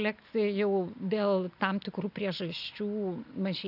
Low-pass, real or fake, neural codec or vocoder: 5.4 kHz; real; none